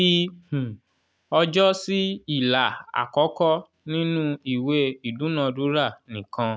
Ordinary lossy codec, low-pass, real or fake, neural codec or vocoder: none; none; real; none